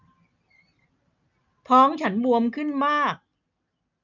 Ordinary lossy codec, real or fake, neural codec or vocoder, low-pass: none; real; none; 7.2 kHz